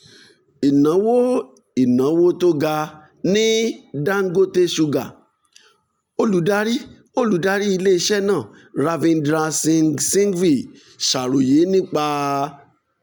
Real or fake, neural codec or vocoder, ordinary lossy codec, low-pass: real; none; none; none